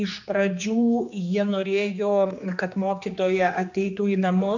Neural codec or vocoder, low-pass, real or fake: codec, 16 kHz, 2 kbps, X-Codec, HuBERT features, trained on general audio; 7.2 kHz; fake